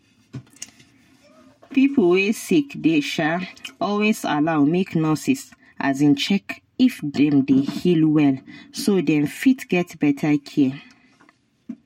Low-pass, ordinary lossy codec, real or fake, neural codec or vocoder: 19.8 kHz; MP3, 64 kbps; real; none